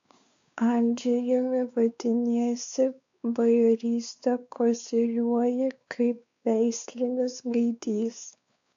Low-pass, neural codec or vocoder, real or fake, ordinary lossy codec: 7.2 kHz; codec, 16 kHz, 4 kbps, X-Codec, WavLM features, trained on Multilingual LibriSpeech; fake; AAC, 64 kbps